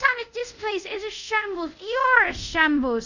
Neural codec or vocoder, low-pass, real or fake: codec, 24 kHz, 0.5 kbps, DualCodec; 7.2 kHz; fake